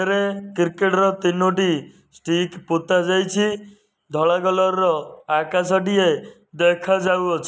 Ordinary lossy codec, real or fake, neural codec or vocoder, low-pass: none; real; none; none